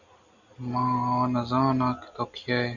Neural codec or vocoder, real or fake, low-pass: none; real; 7.2 kHz